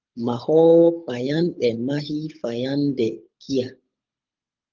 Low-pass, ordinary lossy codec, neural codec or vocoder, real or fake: 7.2 kHz; Opus, 24 kbps; codec, 24 kHz, 6 kbps, HILCodec; fake